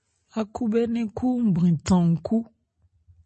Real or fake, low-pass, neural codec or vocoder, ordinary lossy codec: real; 10.8 kHz; none; MP3, 32 kbps